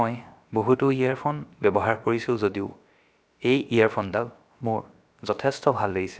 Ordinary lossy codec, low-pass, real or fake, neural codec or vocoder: none; none; fake; codec, 16 kHz, about 1 kbps, DyCAST, with the encoder's durations